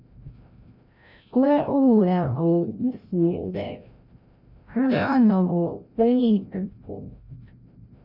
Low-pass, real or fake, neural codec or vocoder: 5.4 kHz; fake; codec, 16 kHz, 0.5 kbps, FreqCodec, larger model